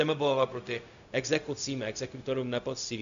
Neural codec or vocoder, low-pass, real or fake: codec, 16 kHz, 0.4 kbps, LongCat-Audio-Codec; 7.2 kHz; fake